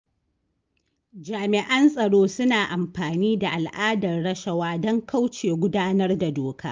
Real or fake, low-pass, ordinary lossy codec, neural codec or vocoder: real; 7.2 kHz; Opus, 24 kbps; none